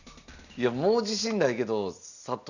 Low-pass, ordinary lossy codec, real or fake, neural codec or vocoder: 7.2 kHz; none; real; none